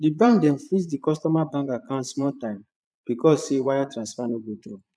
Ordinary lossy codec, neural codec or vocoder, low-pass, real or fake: none; vocoder, 22.05 kHz, 80 mel bands, Vocos; none; fake